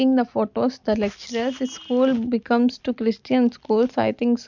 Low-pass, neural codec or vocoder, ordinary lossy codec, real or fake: 7.2 kHz; none; MP3, 64 kbps; real